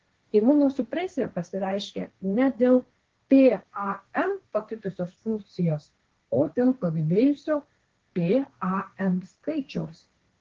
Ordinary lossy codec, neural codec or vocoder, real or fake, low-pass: Opus, 16 kbps; codec, 16 kHz, 1.1 kbps, Voila-Tokenizer; fake; 7.2 kHz